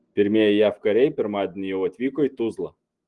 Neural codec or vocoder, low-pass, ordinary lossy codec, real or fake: none; 10.8 kHz; Opus, 24 kbps; real